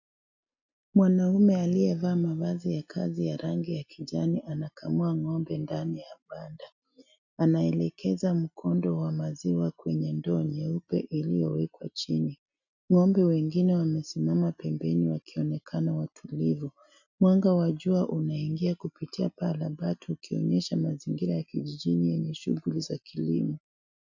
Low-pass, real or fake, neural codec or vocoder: 7.2 kHz; real; none